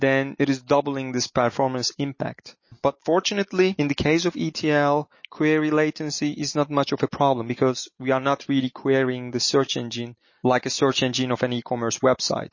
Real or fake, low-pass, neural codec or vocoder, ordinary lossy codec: real; 7.2 kHz; none; MP3, 32 kbps